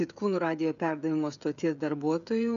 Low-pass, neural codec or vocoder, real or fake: 7.2 kHz; codec, 16 kHz, 16 kbps, FreqCodec, smaller model; fake